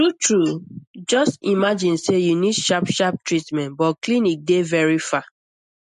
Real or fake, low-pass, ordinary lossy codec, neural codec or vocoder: real; 14.4 kHz; MP3, 48 kbps; none